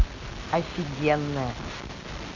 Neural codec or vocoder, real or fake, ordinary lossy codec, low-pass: none; real; AAC, 48 kbps; 7.2 kHz